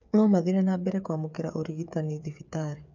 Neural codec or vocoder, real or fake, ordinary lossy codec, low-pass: codec, 16 kHz, 8 kbps, FreqCodec, smaller model; fake; none; 7.2 kHz